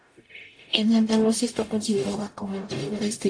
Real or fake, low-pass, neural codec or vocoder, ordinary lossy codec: fake; 9.9 kHz; codec, 44.1 kHz, 0.9 kbps, DAC; AAC, 48 kbps